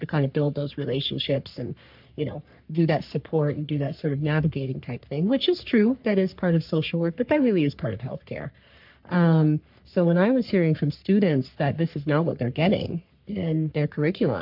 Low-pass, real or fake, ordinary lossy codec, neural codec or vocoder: 5.4 kHz; fake; MP3, 48 kbps; codec, 44.1 kHz, 3.4 kbps, Pupu-Codec